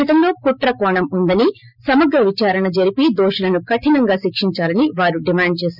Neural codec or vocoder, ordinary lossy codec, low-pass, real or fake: none; none; 5.4 kHz; real